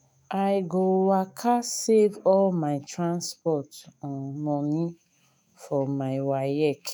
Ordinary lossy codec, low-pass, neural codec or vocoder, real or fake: none; none; autoencoder, 48 kHz, 128 numbers a frame, DAC-VAE, trained on Japanese speech; fake